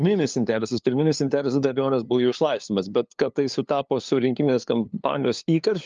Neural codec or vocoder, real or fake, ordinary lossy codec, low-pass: codec, 16 kHz, 2 kbps, FunCodec, trained on LibriTTS, 25 frames a second; fake; Opus, 24 kbps; 7.2 kHz